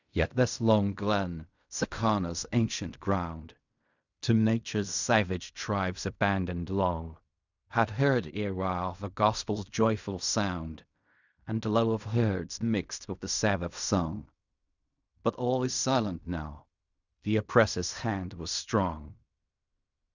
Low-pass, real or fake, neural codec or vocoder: 7.2 kHz; fake; codec, 16 kHz in and 24 kHz out, 0.4 kbps, LongCat-Audio-Codec, fine tuned four codebook decoder